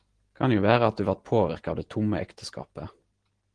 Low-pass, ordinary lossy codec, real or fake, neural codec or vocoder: 9.9 kHz; Opus, 16 kbps; real; none